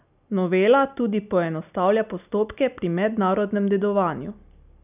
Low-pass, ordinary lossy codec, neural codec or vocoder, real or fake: 3.6 kHz; none; none; real